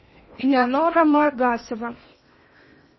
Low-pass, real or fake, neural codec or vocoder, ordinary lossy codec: 7.2 kHz; fake; codec, 16 kHz in and 24 kHz out, 0.8 kbps, FocalCodec, streaming, 65536 codes; MP3, 24 kbps